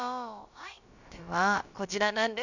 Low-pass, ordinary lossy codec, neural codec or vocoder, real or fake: 7.2 kHz; none; codec, 16 kHz, about 1 kbps, DyCAST, with the encoder's durations; fake